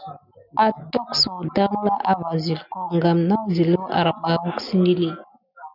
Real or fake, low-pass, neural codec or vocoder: real; 5.4 kHz; none